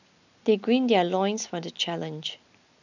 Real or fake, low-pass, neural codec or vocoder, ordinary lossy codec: real; 7.2 kHz; none; none